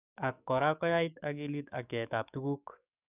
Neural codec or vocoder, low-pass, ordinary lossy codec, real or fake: codec, 44.1 kHz, 7.8 kbps, DAC; 3.6 kHz; none; fake